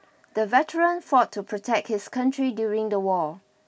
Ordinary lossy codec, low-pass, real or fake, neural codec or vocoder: none; none; real; none